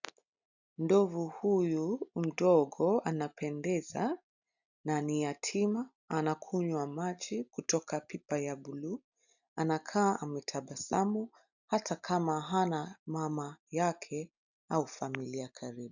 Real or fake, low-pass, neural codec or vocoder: real; 7.2 kHz; none